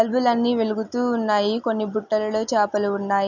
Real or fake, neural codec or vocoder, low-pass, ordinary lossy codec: real; none; 7.2 kHz; none